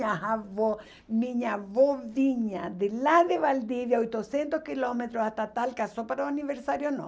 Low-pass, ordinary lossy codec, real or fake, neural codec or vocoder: none; none; real; none